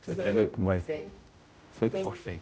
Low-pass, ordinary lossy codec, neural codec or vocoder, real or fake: none; none; codec, 16 kHz, 0.5 kbps, X-Codec, HuBERT features, trained on general audio; fake